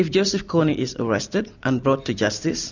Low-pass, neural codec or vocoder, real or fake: 7.2 kHz; none; real